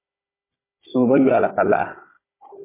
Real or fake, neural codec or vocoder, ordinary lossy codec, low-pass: fake; codec, 16 kHz, 16 kbps, FunCodec, trained on Chinese and English, 50 frames a second; MP3, 16 kbps; 3.6 kHz